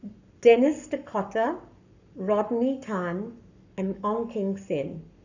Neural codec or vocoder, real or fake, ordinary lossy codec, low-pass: codec, 44.1 kHz, 7.8 kbps, Pupu-Codec; fake; none; 7.2 kHz